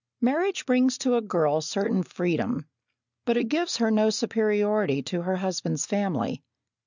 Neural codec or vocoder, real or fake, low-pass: none; real; 7.2 kHz